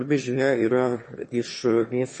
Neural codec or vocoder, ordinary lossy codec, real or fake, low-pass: autoencoder, 22.05 kHz, a latent of 192 numbers a frame, VITS, trained on one speaker; MP3, 32 kbps; fake; 9.9 kHz